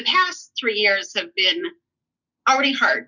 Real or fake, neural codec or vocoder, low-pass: real; none; 7.2 kHz